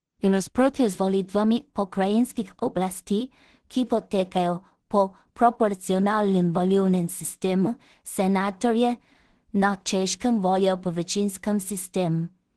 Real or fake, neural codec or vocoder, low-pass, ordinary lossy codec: fake; codec, 16 kHz in and 24 kHz out, 0.4 kbps, LongCat-Audio-Codec, two codebook decoder; 10.8 kHz; Opus, 16 kbps